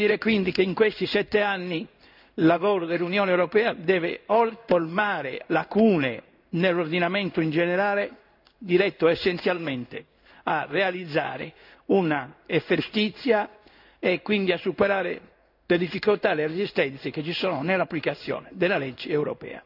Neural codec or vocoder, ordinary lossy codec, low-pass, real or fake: codec, 16 kHz in and 24 kHz out, 1 kbps, XY-Tokenizer; none; 5.4 kHz; fake